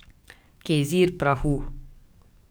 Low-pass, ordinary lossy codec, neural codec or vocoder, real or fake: none; none; codec, 44.1 kHz, 7.8 kbps, DAC; fake